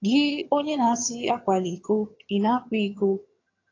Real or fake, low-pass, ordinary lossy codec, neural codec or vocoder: fake; 7.2 kHz; AAC, 32 kbps; vocoder, 22.05 kHz, 80 mel bands, HiFi-GAN